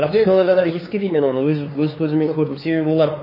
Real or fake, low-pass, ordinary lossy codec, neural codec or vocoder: fake; 5.4 kHz; MP3, 24 kbps; codec, 16 kHz, 4 kbps, X-Codec, HuBERT features, trained on LibriSpeech